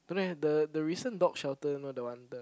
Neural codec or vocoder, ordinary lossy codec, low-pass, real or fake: none; none; none; real